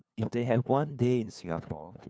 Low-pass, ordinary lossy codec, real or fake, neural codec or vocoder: none; none; fake; codec, 16 kHz, 2 kbps, FunCodec, trained on LibriTTS, 25 frames a second